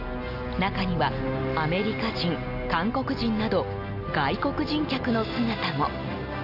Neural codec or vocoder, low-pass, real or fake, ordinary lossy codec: none; 5.4 kHz; real; none